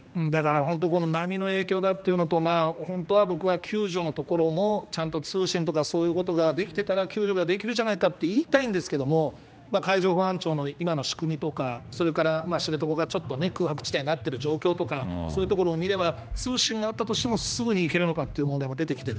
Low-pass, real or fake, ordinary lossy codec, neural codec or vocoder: none; fake; none; codec, 16 kHz, 2 kbps, X-Codec, HuBERT features, trained on general audio